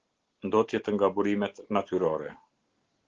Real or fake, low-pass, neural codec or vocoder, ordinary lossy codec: real; 7.2 kHz; none; Opus, 16 kbps